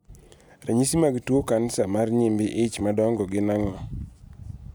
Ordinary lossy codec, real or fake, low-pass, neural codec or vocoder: none; real; none; none